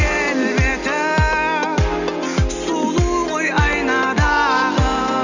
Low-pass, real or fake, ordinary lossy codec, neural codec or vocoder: 7.2 kHz; real; none; none